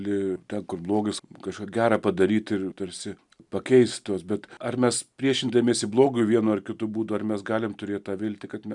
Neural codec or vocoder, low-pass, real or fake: none; 10.8 kHz; real